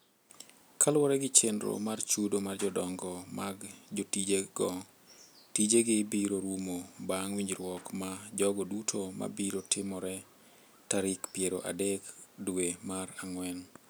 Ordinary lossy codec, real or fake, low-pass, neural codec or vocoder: none; real; none; none